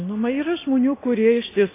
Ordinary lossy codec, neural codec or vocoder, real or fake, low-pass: AAC, 16 kbps; none; real; 3.6 kHz